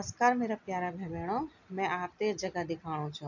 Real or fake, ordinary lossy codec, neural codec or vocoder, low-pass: real; none; none; 7.2 kHz